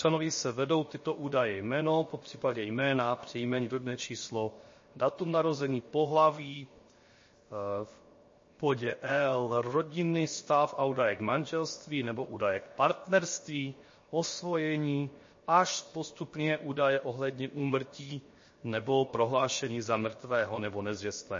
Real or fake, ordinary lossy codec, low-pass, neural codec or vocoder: fake; MP3, 32 kbps; 7.2 kHz; codec, 16 kHz, 0.7 kbps, FocalCodec